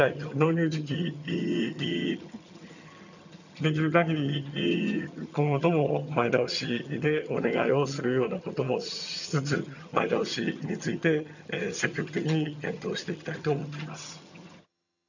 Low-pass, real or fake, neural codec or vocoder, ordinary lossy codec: 7.2 kHz; fake; vocoder, 22.05 kHz, 80 mel bands, HiFi-GAN; none